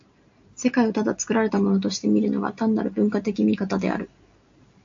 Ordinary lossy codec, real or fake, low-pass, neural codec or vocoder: AAC, 64 kbps; real; 7.2 kHz; none